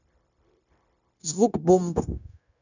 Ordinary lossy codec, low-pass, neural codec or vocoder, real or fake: none; 7.2 kHz; codec, 16 kHz, 0.9 kbps, LongCat-Audio-Codec; fake